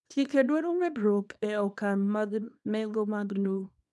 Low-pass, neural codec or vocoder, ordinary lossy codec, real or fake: none; codec, 24 kHz, 0.9 kbps, WavTokenizer, small release; none; fake